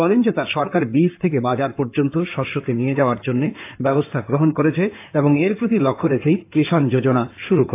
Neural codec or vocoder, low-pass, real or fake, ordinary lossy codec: codec, 16 kHz in and 24 kHz out, 2.2 kbps, FireRedTTS-2 codec; 3.6 kHz; fake; AAC, 24 kbps